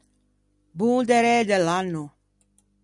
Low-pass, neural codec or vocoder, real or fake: 10.8 kHz; none; real